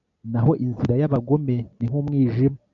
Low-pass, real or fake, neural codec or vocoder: 7.2 kHz; real; none